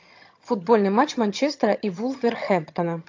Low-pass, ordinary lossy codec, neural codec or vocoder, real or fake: 7.2 kHz; AAC, 48 kbps; vocoder, 22.05 kHz, 80 mel bands, HiFi-GAN; fake